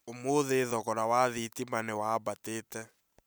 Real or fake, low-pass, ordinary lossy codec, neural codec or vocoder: real; none; none; none